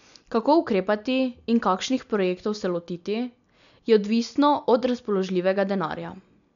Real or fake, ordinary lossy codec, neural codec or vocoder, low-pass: real; none; none; 7.2 kHz